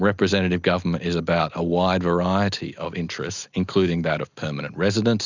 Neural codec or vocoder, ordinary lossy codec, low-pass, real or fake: none; Opus, 64 kbps; 7.2 kHz; real